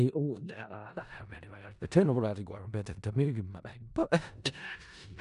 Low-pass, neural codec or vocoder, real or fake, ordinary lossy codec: 10.8 kHz; codec, 16 kHz in and 24 kHz out, 0.4 kbps, LongCat-Audio-Codec, four codebook decoder; fake; none